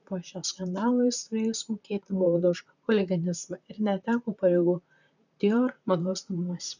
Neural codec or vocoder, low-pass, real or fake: vocoder, 44.1 kHz, 128 mel bands, Pupu-Vocoder; 7.2 kHz; fake